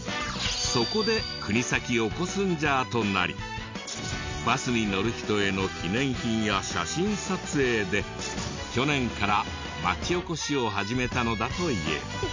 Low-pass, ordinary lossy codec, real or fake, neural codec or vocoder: 7.2 kHz; MP3, 64 kbps; real; none